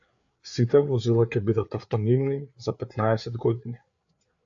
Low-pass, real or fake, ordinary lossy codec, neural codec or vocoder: 7.2 kHz; fake; AAC, 64 kbps; codec, 16 kHz, 4 kbps, FreqCodec, larger model